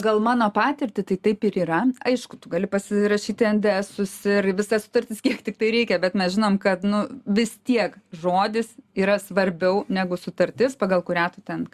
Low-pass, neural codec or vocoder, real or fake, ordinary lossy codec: 14.4 kHz; none; real; Opus, 64 kbps